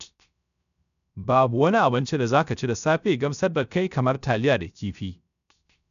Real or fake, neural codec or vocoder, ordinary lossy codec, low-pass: fake; codec, 16 kHz, 0.3 kbps, FocalCodec; none; 7.2 kHz